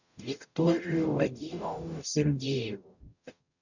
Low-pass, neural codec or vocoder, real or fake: 7.2 kHz; codec, 44.1 kHz, 0.9 kbps, DAC; fake